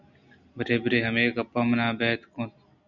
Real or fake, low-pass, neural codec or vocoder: real; 7.2 kHz; none